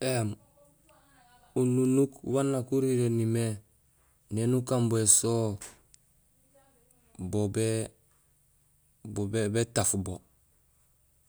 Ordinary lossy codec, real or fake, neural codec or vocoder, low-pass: none; real; none; none